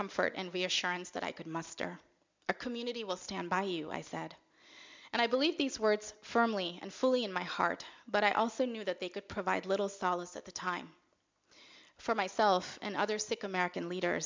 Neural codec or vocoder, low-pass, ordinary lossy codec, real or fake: none; 7.2 kHz; MP3, 64 kbps; real